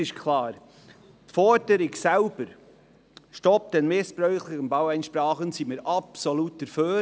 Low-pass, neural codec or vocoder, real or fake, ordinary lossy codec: none; none; real; none